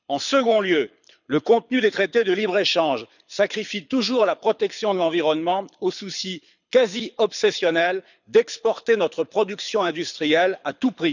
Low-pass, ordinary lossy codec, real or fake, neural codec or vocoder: 7.2 kHz; none; fake; codec, 24 kHz, 6 kbps, HILCodec